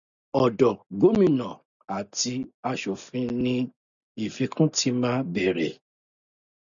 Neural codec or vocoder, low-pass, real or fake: none; 7.2 kHz; real